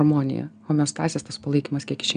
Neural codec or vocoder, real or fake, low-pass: none; real; 9.9 kHz